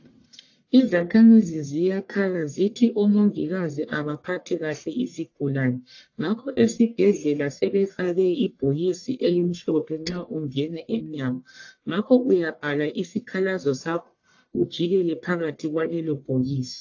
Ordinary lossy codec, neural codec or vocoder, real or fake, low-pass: AAC, 48 kbps; codec, 44.1 kHz, 1.7 kbps, Pupu-Codec; fake; 7.2 kHz